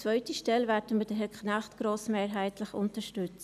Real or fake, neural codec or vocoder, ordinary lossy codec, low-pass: real; none; none; 14.4 kHz